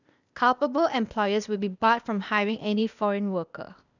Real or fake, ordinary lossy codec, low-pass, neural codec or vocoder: fake; none; 7.2 kHz; codec, 16 kHz, 0.8 kbps, ZipCodec